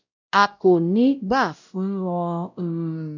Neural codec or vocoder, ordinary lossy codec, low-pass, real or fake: codec, 16 kHz, 0.5 kbps, X-Codec, WavLM features, trained on Multilingual LibriSpeech; none; 7.2 kHz; fake